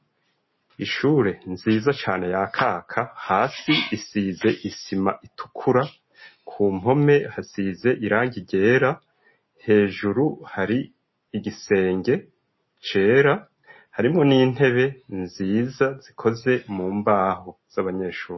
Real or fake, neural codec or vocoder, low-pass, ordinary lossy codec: real; none; 7.2 kHz; MP3, 24 kbps